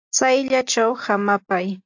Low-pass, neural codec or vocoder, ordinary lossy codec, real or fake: 7.2 kHz; none; AAC, 48 kbps; real